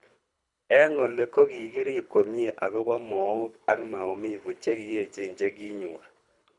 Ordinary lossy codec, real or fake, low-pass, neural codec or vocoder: none; fake; 10.8 kHz; codec, 24 kHz, 3 kbps, HILCodec